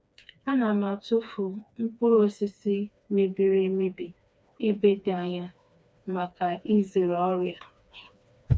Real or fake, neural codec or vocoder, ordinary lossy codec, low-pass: fake; codec, 16 kHz, 2 kbps, FreqCodec, smaller model; none; none